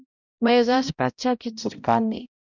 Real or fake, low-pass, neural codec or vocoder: fake; 7.2 kHz; codec, 16 kHz, 0.5 kbps, X-Codec, HuBERT features, trained on balanced general audio